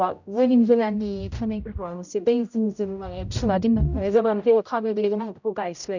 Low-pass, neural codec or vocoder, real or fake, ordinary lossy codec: 7.2 kHz; codec, 16 kHz, 0.5 kbps, X-Codec, HuBERT features, trained on general audio; fake; none